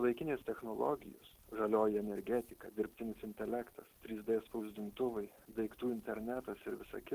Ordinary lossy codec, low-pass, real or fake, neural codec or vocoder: Opus, 16 kbps; 14.4 kHz; real; none